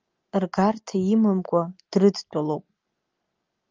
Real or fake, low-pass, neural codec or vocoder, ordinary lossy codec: real; 7.2 kHz; none; Opus, 32 kbps